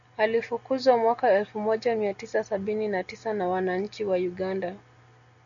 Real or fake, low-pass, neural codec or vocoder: real; 7.2 kHz; none